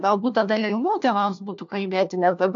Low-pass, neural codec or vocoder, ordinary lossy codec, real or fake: 7.2 kHz; codec, 16 kHz, 1 kbps, FunCodec, trained on LibriTTS, 50 frames a second; AAC, 64 kbps; fake